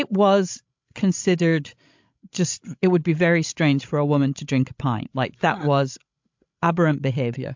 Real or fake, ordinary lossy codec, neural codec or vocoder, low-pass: fake; MP3, 64 kbps; codec, 16 kHz, 16 kbps, FreqCodec, larger model; 7.2 kHz